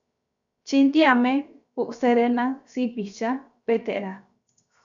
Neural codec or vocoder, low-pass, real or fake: codec, 16 kHz, 0.7 kbps, FocalCodec; 7.2 kHz; fake